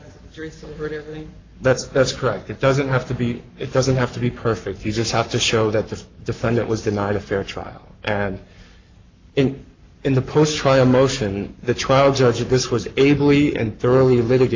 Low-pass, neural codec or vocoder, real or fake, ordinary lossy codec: 7.2 kHz; codec, 44.1 kHz, 7.8 kbps, Pupu-Codec; fake; AAC, 48 kbps